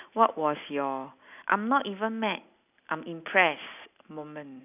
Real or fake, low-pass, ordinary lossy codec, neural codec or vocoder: real; 3.6 kHz; none; none